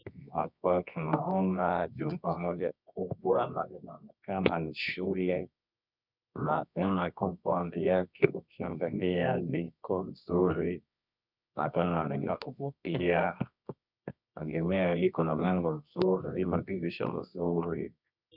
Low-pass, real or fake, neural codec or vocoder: 5.4 kHz; fake; codec, 24 kHz, 0.9 kbps, WavTokenizer, medium music audio release